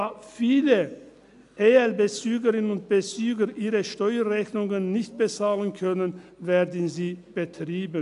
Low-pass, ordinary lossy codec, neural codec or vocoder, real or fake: 10.8 kHz; none; none; real